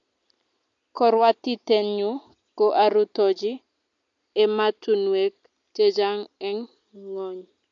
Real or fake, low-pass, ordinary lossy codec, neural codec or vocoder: real; 7.2 kHz; MP3, 48 kbps; none